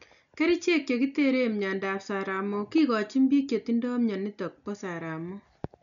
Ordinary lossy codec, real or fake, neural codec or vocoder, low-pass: none; real; none; 7.2 kHz